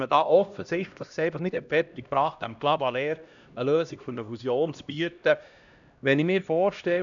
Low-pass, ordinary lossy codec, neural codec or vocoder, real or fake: 7.2 kHz; none; codec, 16 kHz, 1 kbps, X-Codec, HuBERT features, trained on LibriSpeech; fake